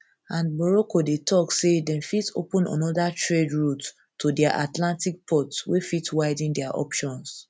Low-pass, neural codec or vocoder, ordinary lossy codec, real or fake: none; none; none; real